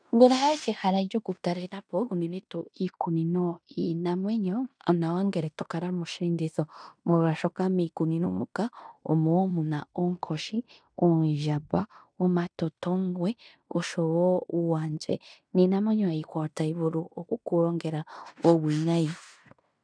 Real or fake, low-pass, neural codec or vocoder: fake; 9.9 kHz; codec, 16 kHz in and 24 kHz out, 0.9 kbps, LongCat-Audio-Codec, fine tuned four codebook decoder